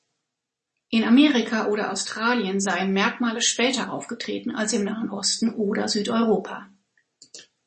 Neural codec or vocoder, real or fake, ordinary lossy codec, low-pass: none; real; MP3, 32 kbps; 10.8 kHz